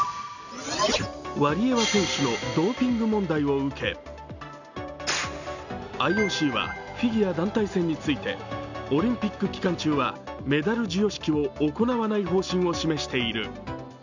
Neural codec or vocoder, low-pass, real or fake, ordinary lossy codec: none; 7.2 kHz; real; none